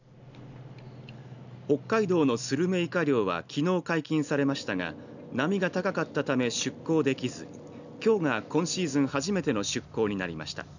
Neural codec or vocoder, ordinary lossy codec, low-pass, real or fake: none; none; 7.2 kHz; real